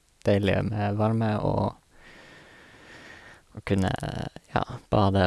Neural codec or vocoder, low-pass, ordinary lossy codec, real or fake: none; none; none; real